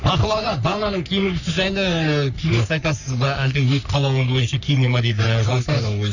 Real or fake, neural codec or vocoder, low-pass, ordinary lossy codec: fake; codec, 44.1 kHz, 3.4 kbps, Pupu-Codec; 7.2 kHz; none